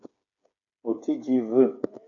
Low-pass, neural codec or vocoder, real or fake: 7.2 kHz; codec, 16 kHz, 8 kbps, FreqCodec, smaller model; fake